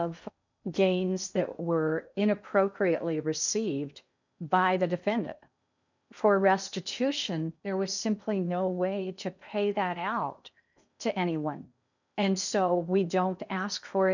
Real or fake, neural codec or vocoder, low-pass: fake; codec, 16 kHz in and 24 kHz out, 0.8 kbps, FocalCodec, streaming, 65536 codes; 7.2 kHz